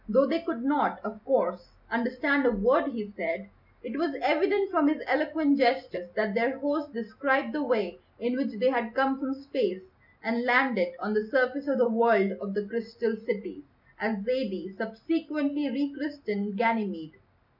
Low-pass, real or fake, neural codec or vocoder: 5.4 kHz; real; none